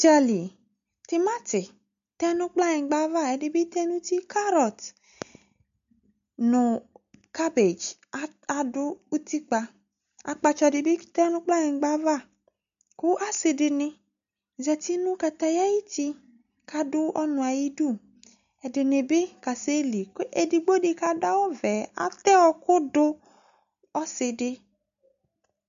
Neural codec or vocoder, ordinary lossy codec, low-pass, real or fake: none; MP3, 64 kbps; 7.2 kHz; real